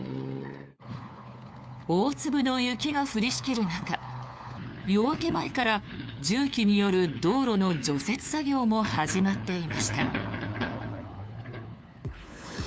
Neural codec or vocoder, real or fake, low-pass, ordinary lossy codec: codec, 16 kHz, 4 kbps, FunCodec, trained on LibriTTS, 50 frames a second; fake; none; none